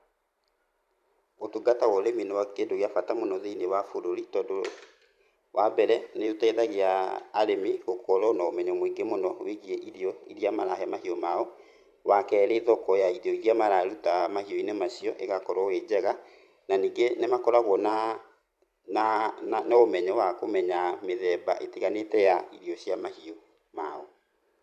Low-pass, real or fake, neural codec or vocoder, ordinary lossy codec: 14.4 kHz; fake; vocoder, 44.1 kHz, 128 mel bands every 512 samples, BigVGAN v2; MP3, 96 kbps